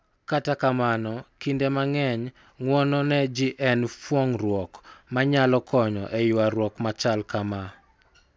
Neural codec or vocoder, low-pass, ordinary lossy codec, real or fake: none; none; none; real